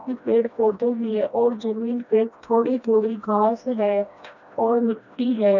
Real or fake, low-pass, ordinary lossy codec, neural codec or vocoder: fake; 7.2 kHz; none; codec, 16 kHz, 1 kbps, FreqCodec, smaller model